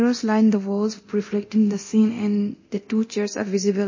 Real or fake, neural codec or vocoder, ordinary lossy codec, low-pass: fake; codec, 24 kHz, 0.9 kbps, DualCodec; MP3, 32 kbps; 7.2 kHz